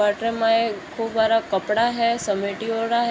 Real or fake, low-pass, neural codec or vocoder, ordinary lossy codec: real; none; none; none